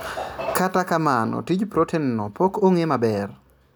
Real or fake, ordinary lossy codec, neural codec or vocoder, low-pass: real; none; none; none